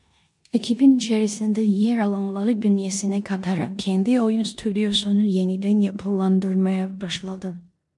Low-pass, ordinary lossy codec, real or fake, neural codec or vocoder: 10.8 kHz; MP3, 64 kbps; fake; codec, 16 kHz in and 24 kHz out, 0.9 kbps, LongCat-Audio-Codec, four codebook decoder